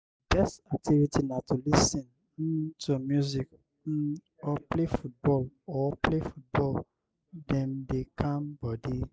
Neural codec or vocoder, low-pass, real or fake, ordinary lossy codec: none; none; real; none